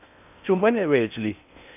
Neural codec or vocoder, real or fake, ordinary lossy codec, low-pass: codec, 16 kHz in and 24 kHz out, 0.6 kbps, FocalCodec, streaming, 4096 codes; fake; none; 3.6 kHz